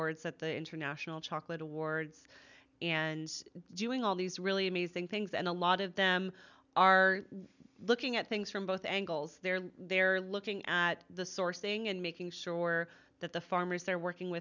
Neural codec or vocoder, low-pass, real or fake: none; 7.2 kHz; real